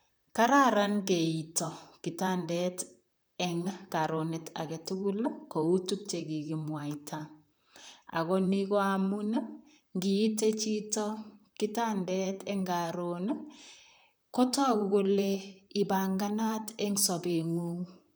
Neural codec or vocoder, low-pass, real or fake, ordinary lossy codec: vocoder, 44.1 kHz, 128 mel bands, Pupu-Vocoder; none; fake; none